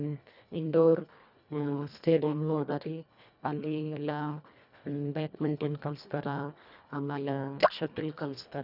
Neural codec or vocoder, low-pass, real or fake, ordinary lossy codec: codec, 24 kHz, 1.5 kbps, HILCodec; 5.4 kHz; fake; none